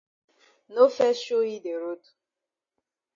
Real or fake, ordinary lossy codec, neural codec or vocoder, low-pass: real; MP3, 32 kbps; none; 7.2 kHz